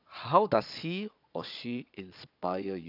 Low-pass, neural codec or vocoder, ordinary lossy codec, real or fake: 5.4 kHz; vocoder, 22.05 kHz, 80 mel bands, Vocos; none; fake